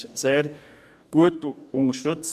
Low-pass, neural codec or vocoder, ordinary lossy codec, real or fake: 14.4 kHz; codec, 44.1 kHz, 2.6 kbps, DAC; MP3, 96 kbps; fake